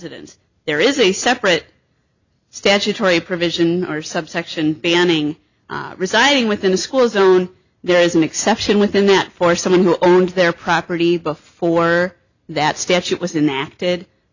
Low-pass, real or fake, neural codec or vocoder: 7.2 kHz; real; none